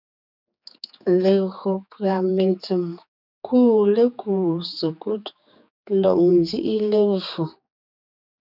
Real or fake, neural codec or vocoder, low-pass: fake; codec, 16 kHz, 4 kbps, X-Codec, HuBERT features, trained on general audio; 5.4 kHz